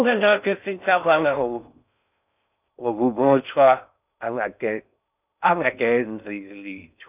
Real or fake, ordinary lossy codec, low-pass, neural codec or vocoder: fake; none; 3.6 kHz; codec, 16 kHz in and 24 kHz out, 0.6 kbps, FocalCodec, streaming, 4096 codes